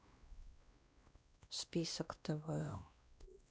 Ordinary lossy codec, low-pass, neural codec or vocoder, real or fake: none; none; codec, 16 kHz, 1 kbps, X-Codec, WavLM features, trained on Multilingual LibriSpeech; fake